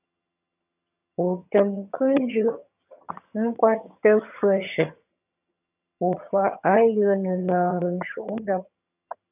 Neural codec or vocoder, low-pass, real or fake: vocoder, 22.05 kHz, 80 mel bands, HiFi-GAN; 3.6 kHz; fake